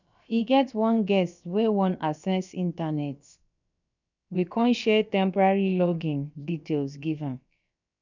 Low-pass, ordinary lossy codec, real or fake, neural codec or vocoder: 7.2 kHz; none; fake; codec, 16 kHz, about 1 kbps, DyCAST, with the encoder's durations